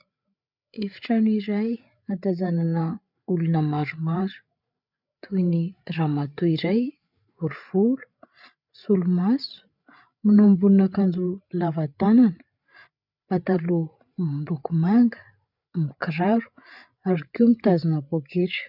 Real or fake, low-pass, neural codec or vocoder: fake; 5.4 kHz; codec, 16 kHz, 8 kbps, FreqCodec, larger model